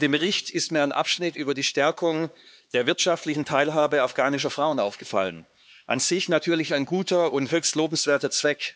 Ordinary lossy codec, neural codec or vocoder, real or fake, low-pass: none; codec, 16 kHz, 4 kbps, X-Codec, HuBERT features, trained on LibriSpeech; fake; none